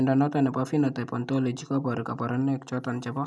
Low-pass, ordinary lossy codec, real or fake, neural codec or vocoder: none; none; real; none